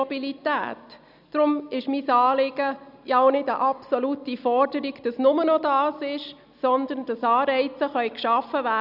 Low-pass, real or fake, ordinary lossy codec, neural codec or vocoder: 5.4 kHz; real; none; none